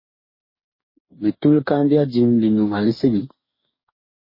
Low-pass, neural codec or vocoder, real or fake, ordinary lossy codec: 5.4 kHz; codec, 44.1 kHz, 2.6 kbps, DAC; fake; MP3, 24 kbps